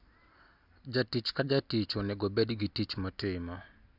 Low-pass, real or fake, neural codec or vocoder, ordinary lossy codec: 5.4 kHz; real; none; none